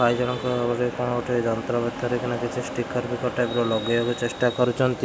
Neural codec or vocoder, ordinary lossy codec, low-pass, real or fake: none; none; none; real